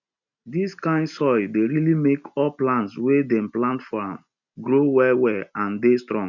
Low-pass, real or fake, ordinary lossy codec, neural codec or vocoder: 7.2 kHz; real; none; none